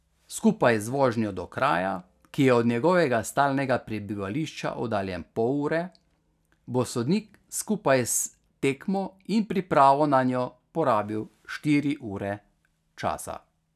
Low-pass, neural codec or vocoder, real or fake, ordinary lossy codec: 14.4 kHz; none; real; none